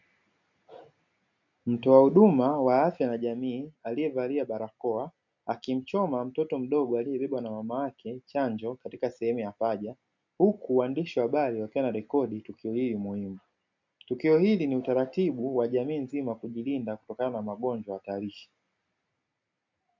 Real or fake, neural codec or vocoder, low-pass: real; none; 7.2 kHz